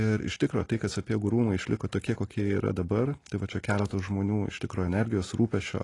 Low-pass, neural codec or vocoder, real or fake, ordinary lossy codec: 10.8 kHz; none; real; AAC, 32 kbps